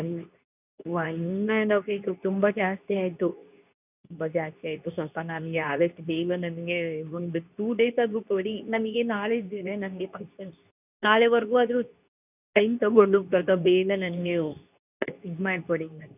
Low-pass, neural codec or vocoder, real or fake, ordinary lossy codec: 3.6 kHz; codec, 24 kHz, 0.9 kbps, WavTokenizer, medium speech release version 2; fake; none